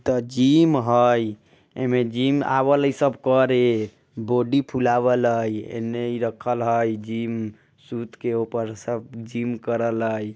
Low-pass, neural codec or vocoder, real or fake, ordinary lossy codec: none; none; real; none